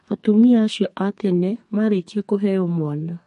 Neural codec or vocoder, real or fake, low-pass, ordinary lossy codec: codec, 32 kHz, 1.9 kbps, SNAC; fake; 14.4 kHz; MP3, 48 kbps